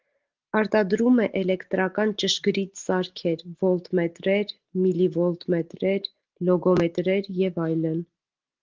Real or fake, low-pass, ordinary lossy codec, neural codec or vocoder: real; 7.2 kHz; Opus, 16 kbps; none